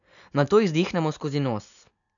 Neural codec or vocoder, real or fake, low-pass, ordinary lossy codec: none; real; 7.2 kHz; none